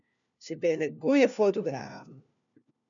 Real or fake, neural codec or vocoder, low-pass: fake; codec, 16 kHz, 1 kbps, FunCodec, trained on LibriTTS, 50 frames a second; 7.2 kHz